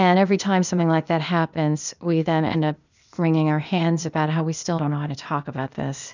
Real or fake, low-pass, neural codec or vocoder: fake; 7.2 kHz; codec, 16 kHz, 0.8 kbps, ZipCodec